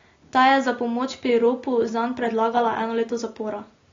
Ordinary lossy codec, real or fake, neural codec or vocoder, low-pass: AAC, 32 kbps; real; none; 7.2 kHz